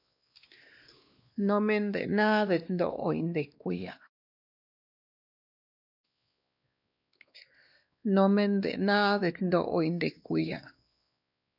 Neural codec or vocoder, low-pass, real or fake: codec, 16 kHz, 2 kbps, X-Codec, WavLM features, trained on Multilingual LibriSpeech; 5.4 kHz; fake